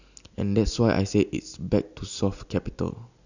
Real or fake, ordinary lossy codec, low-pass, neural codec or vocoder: real; none; 7.2 kHz; none